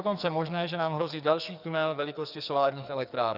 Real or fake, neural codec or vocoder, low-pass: fake; codec, 44.1 kHz, 2.6 kbps, SNAC; 5.4 kHz